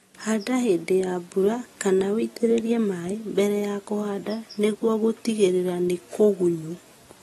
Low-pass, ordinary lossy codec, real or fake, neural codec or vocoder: 14.4 kHz; AAC, 32 kbps; real; none